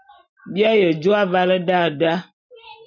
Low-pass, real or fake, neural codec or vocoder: 7.2 kHz; real; none